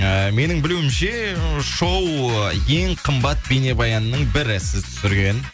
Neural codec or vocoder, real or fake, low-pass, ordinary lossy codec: none; real; none; none